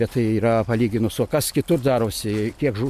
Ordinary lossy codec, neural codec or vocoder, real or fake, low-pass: MP3, 96 kbps; none; real; 14.4 kHz